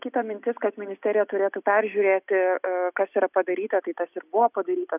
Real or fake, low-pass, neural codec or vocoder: real; 3.6 kHz; none